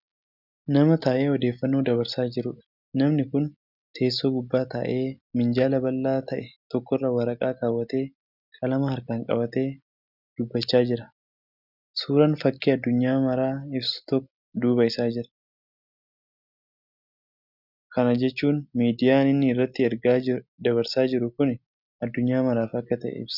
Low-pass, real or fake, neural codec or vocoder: 5.4 kHz; real; none